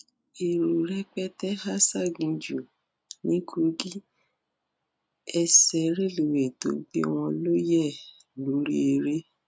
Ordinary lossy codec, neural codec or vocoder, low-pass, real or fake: none; none; none; real